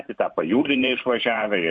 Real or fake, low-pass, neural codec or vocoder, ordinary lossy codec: fake; 9.9 kHz; vocoder, 44.1 kHz, 128 mel bands every 512 samples, BigVGAN v2; MP3, 48 kbps